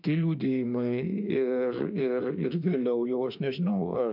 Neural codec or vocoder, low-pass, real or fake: autoencoder, 48 kHz, 32 numbers a frame, DAC-VAE, trained on Japanese speech; 5.4 kHz; fake